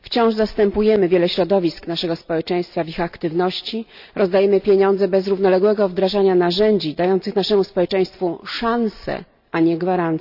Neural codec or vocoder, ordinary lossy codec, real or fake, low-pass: none; none; real; 5.4 kHz